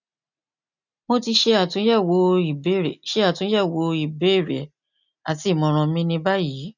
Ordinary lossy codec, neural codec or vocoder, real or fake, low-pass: none; none; real; 7.2 kHz